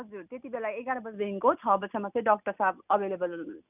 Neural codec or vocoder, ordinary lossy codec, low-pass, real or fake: none; none; 3.6 kHz; real